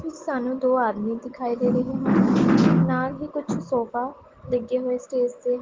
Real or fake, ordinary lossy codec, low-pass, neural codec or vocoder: real; Opus, 16 kbps; 7.2 kHz; none